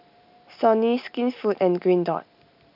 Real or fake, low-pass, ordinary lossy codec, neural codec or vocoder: real; 5.4 kHz; none; none